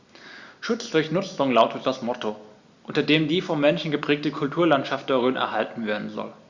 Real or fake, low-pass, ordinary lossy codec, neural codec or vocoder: real; 7.2 kHz; Opus, 64 kbps; none